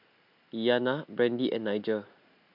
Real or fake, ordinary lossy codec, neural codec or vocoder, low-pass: real; MP3, 48 kbps; none; 5.4 kHz